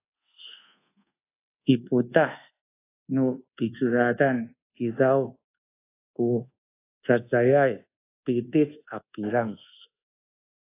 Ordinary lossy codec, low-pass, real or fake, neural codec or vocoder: AAC, 24 kbps; 3.6 kHz; fake; codec, 24 kHz, 1.2 kbps, DualCodec